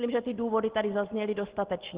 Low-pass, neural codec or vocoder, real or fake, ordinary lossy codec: 3.6 kHz; none; real; Opus, 16 kbps